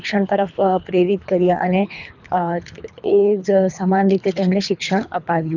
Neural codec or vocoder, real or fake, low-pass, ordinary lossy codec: codec, 24 kHz, 3 kbps, HILCodec; fake; 7.2 kHz; none